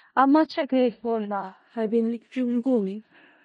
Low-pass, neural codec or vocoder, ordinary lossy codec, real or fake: 10.8 kHz; codec, 16 kHz in and 24 kHz out, 0.4 kbps, LongCat-Audio-Codec, four codebook decoder; MP3, 48 kbps; fake